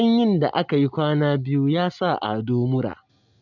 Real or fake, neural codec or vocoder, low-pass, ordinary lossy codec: real; none; 7.2 kHz; none